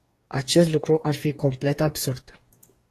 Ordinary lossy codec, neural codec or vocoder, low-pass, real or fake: AAC, 48 kbps; codec, 44.1 kHz, 2.6 kbps, DAC; 14.4 kHz; fake